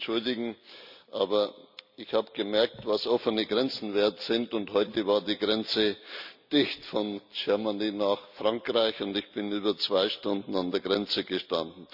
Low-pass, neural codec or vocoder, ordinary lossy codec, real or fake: 5.4 kHz; none; none; real